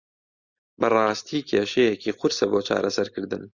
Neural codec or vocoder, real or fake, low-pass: none; real; 7.2 kHz